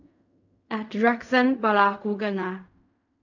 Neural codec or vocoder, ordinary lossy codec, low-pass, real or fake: codec, 16 kHz in and 24 kHz out, 0.4 kbps, LongCat-Audio-Codec, fine tuned four codebook decoder; none; 7.2 kHz; fake